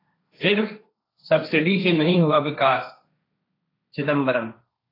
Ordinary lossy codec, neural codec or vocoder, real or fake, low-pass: AAC, 24 kbps; codec, 16 kHz, 1.1 kbps, Voila-Tokenizer; fake; 5.4 kHz